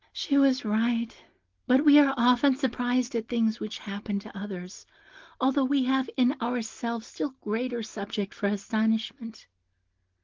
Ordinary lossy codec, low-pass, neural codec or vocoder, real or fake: Opus, 16 kbps; 7.2 kHz; none; real